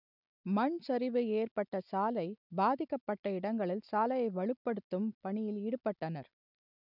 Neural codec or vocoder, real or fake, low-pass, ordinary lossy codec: none; real; 5.4 kHz; none